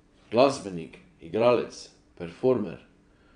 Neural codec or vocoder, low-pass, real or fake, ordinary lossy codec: vocoder, 22.05 kHz, 80 mel bands, WaveNeXt; 9.9 kHz; fake; none